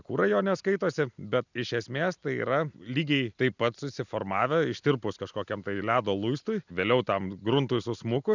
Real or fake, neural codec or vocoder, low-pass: real; none; 7.2 kHz